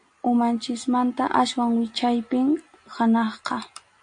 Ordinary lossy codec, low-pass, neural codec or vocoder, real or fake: AAC, 64 kbps; 9.9 kHz; none; real